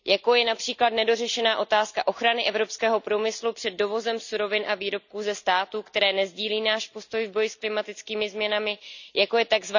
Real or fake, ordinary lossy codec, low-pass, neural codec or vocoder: real; none; 7.2 kHz; none